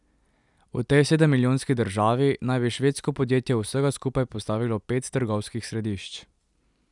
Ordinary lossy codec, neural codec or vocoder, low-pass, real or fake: none; none; 10.8 kHz; real